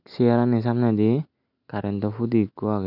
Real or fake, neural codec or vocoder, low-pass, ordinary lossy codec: real; none; 5.4 kHz; none